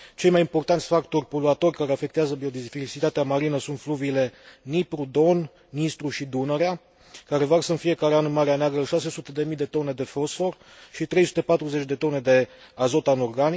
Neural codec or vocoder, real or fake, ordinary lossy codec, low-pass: none; real; none; none